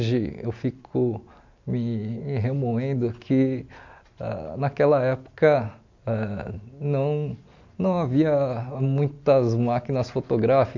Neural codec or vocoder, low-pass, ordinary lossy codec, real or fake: none; 7.2 kHz; MP3, 64 kbps; real